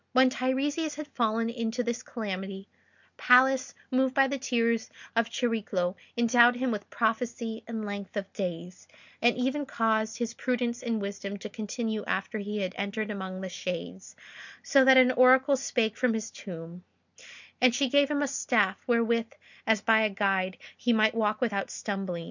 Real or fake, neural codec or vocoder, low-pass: real; none; 7.2 kHz